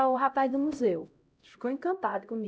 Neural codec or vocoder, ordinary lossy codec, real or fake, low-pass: codec, 16 kHz, 0.5 kbps, X-Codec, HuBERT features, trained on LibriSpeech; none; fake; none